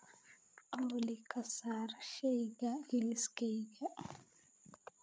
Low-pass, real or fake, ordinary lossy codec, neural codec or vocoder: none; fake; none; codec, 16 kHz, 8 kbps, FreqCodec, larger model